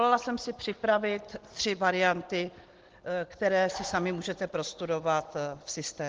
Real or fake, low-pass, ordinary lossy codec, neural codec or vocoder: real; 7.2 kHz; Opus, 16 kbps; none